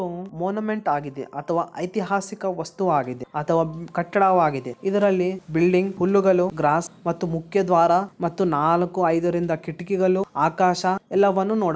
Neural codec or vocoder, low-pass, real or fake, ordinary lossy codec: none; none; real; none